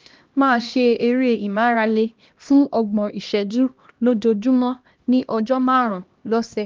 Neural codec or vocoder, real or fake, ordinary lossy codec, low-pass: codec, 16 kHz, 1 kbps, X-Codec, HuBERT features, trained on LibriSpeech; fake; Opus, 24 kbps; 7.2 kHz